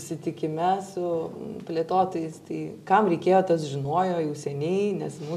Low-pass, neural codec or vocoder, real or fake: 14.4 kHz; none; real